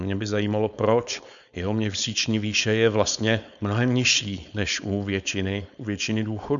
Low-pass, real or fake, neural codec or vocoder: 7.2 kHz; fake; codec, 16 kHz, 4.8 kbps, FACodec